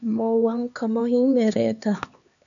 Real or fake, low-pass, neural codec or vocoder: fake; 7.2 kHz; codec, 16 kHz, 2 kbps, X-Codec, HuBERT features, trained on LibriSpeech